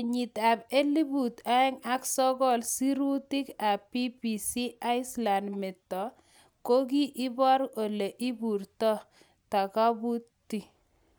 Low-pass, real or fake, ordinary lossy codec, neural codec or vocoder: none; real; none; none